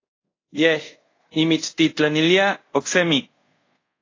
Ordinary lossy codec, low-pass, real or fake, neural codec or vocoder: AAC, 32 kbps; 7.2 kHz; fake; codec, 24 kHz, 0.5 kbps, DualCodec